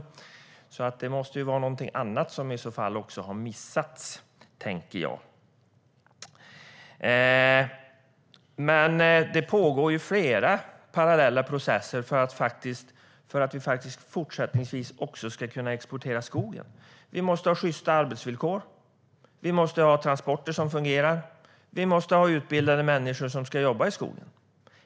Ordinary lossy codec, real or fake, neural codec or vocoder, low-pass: none; real; none; none